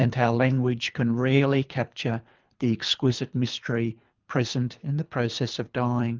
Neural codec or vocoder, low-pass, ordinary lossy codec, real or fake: codec, 24 kHz, 3 kbps, HILCodec; 7.2 kHz; Opus, 24 kbps; fake